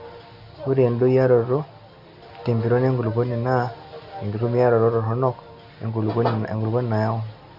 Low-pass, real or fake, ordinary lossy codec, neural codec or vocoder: 5.4 kHz; real; none; none